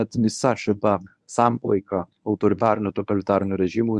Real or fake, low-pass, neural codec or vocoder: fake; 10.8 kHz; codec, 24 kHz, 0.9 kbps, WavTokenizer, medium speech release version 1